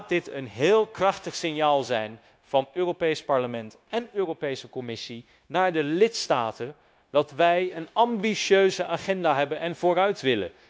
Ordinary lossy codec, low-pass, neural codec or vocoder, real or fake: none; none; codec, 16 kHz, 0.9 kbps, LongCat-Audio-Codec; fake